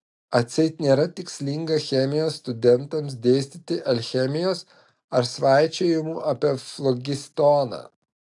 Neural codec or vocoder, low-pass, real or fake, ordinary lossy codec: none; 10.8 kHz; real; AAC, 64 kbps